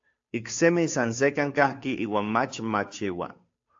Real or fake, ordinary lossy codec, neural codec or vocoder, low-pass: fake; AAC, 48 kbps; codec, 16 kHz, 2 kbps, FunCodec, trained on Chinese and English, 25 frames a second; 7.2 kHz